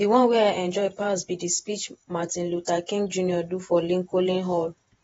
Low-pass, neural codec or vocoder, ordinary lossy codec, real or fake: 19.8 kHz; vocoder, 48 kHz, 128 mel bands, Vocos; AAC, 24 kbps; fake